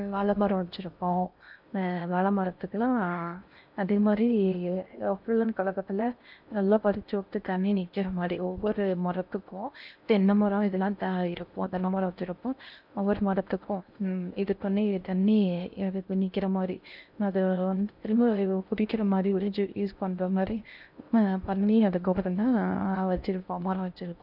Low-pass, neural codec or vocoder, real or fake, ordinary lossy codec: 5.4 kHz; codec, 16 kHz in and 24 kHz out, 0.6 kbps, FocalCodec, streaming, 4096 codes; fake; none